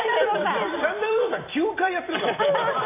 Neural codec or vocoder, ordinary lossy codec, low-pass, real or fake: vocoder, 44.1 kHz, 128 mel bands every 256 samples, BigVGAN v2; none; 3.6 kHz; fake